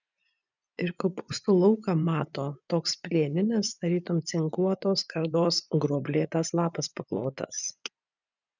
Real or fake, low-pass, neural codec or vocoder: fake; 7.2 kHz; vocoder, 24 kHz, 100 mel bands, Vocos